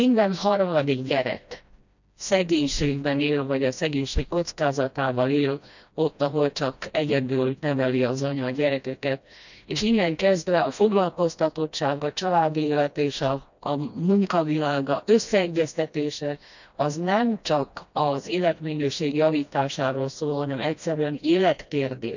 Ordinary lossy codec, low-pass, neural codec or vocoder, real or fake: none; 7.2 kHz; codec, 16 kHz, 1 kbps, FreqCodec, smaller model; fake